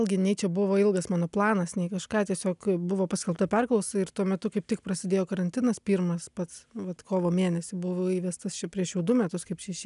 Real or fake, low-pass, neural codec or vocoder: real; 10.8 kHz; none